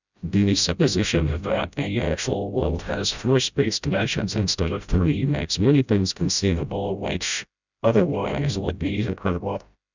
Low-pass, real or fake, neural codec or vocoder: 7.2 kHz; fake; codec, 16 kHz, 0.5 kbps, FreqCodec, smaller model